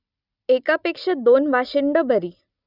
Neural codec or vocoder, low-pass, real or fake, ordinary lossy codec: none; 5.4 kHz; real; none